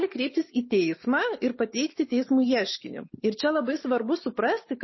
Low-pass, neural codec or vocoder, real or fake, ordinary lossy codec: 7.2 kHz; none; real; MP3, 24 kbps